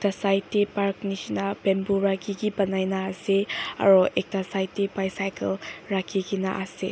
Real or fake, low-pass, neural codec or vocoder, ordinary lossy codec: real; none; none; none